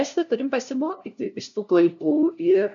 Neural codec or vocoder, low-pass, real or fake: codec, 16 kHz, 0.5 kbps, FunCodec, trained on LibriTTS, 25 frames a second; 7.2 kHz; fake